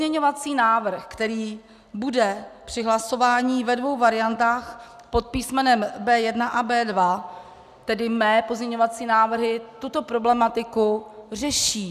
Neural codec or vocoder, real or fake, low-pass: none; real; 14.4 kHz